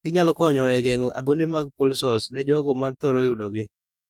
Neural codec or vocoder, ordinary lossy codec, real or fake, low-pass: codec, 44.1 kHz, 2.6 kbps, DAC; none; fake; 19.8 kHz